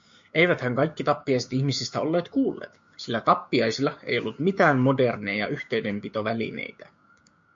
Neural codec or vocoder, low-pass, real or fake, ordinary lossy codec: codec, 16 kHz, 6 kbps, DAC; 7.2 kHz; fake; MP3, 48 kbps